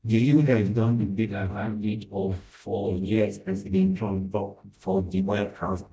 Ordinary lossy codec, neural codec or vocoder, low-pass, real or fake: none; codec, 16 kHz, 0.5 kbps, FreqCodec, smaller model; none; fake